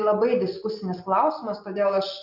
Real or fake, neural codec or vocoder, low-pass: real; none; 5.4 kHz